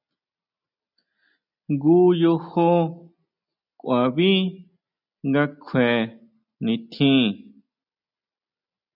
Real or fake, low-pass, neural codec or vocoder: real; 5.4 kHz; none